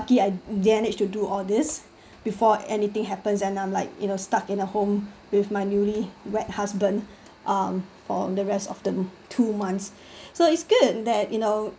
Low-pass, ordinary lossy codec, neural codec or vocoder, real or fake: none; none; none; real